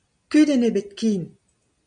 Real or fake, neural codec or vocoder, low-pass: real; none; 9.9 kHz